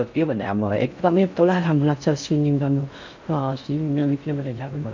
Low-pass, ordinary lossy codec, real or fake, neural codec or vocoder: 7.2 kHz; MP3, 64 kbps; fake; codec, 16 kHz in and 24 kHz out, 0.6 kbps, FocalCodec, streaming, 4096 codes